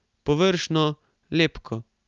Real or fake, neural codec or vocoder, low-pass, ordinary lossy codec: real; none; 7.2 kHz; Opus, 32 kbps